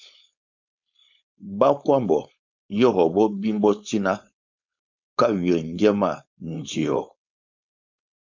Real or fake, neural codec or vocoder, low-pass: fake; codec, 16 kHz, 4.8 kbps, FACodec; 7.2 kHz